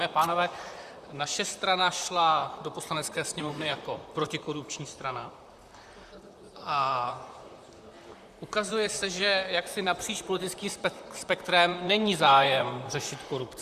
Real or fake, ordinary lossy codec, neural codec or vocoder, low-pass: fake; Opus, 64 kbps; vocoder, 44.1 kHz, 128 mel bands, Pupu-Vocoder; 14.4 kHz